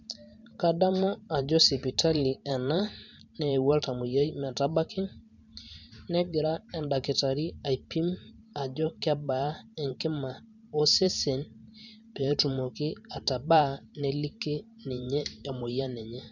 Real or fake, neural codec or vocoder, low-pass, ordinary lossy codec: fake; vocoder, 44.1 kHz, 128 mel bands every 512 samples, BigVGAN v2; 7.2 kHz; none